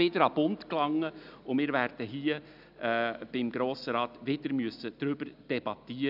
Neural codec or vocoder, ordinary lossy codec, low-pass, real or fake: none; none; 5.4 kHz; real